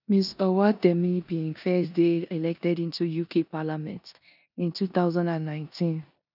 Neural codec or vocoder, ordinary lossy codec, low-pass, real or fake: codec, 16 kHz in and 24 kHz out, 0.9 kbps, LongCat-Audio-Codec, four codebook decoder; none; 5.4 kHz; fake